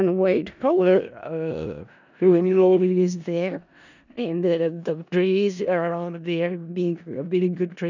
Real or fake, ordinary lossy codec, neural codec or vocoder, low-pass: fake; none; codec, 16 kHz in and 24 kHz out, 0.4 kbps, LongCat-Audio-Codec, four codebook decoder; 7.2 kHz